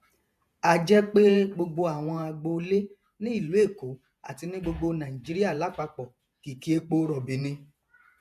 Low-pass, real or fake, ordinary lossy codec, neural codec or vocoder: 14.4 kHz; fake; MP3, 96 kbps; vocoder, 48 kHz, 128 mel bands, Vocos